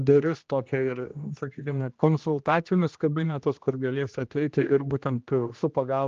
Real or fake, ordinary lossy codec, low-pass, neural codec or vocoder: fake; Opus, 24 kbps; 7.2 kHz; codec, 16 kHz, 1 kbps, X-Codec, HuBERT features, trained on general audio